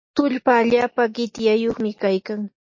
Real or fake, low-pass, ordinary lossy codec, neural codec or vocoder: real; 7.2 kHz; MP3, 32 kbps; none